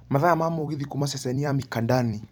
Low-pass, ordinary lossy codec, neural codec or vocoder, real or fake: 19.8 kHz; none; vocoder, 44.1 kHz, 128 mel bands every 256 samples, BigVGAN v2; fake